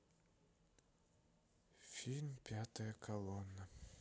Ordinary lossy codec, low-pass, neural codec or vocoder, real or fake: none; none; none; real